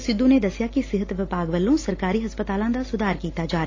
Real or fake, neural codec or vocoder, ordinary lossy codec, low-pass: real; none; AAC, 32 kbps; 7.2 kHz